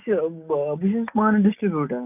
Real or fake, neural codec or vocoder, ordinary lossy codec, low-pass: real; none; none; 3.6 kHz